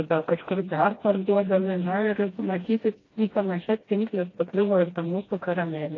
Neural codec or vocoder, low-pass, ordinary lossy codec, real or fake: codec, 16 kHz, 1 kbps, FreqCodec, smaller model; 7.2 kHz; AAC, 32 kbps; fake